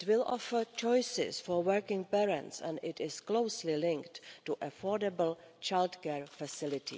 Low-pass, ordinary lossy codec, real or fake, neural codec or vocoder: none; none; real; none